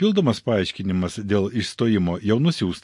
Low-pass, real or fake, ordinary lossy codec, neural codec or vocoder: 10.8 kHz; real; MP3, 48 kbps; none